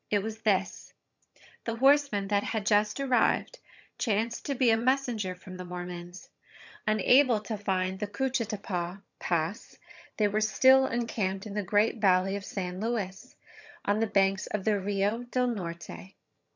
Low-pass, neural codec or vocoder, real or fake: 7.2 kHz; vocoder, 22.05 kHz, 80 mel bands, HiFi-GAN; fake